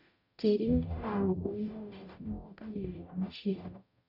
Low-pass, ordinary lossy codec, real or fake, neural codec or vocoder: 5.4 kHz; none; fake; codec, 44.1 kHz, 0.9 kbps, DAC